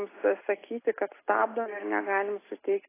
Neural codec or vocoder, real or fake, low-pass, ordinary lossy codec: none; real; 3.6 kHz; AAC, 16 kbps